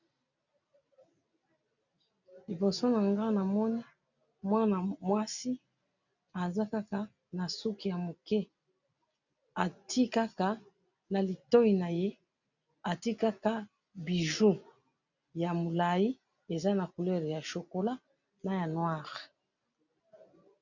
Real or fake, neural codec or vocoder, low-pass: real; none; 7.2 kHz